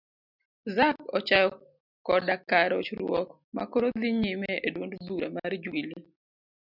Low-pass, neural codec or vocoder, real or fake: 5.4 kHz; none; real